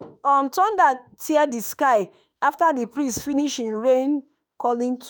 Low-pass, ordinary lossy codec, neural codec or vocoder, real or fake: none; none; autoencoder, 48 kHz, 32 numbers a frame, DAC-VAE, trained on Japanese speech; fake